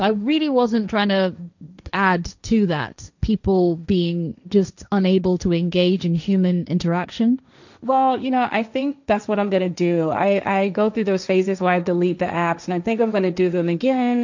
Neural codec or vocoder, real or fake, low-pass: codec, 16 kHz, 1.1 kbps, Voila-Tokenizer; fake; 7.2 kHz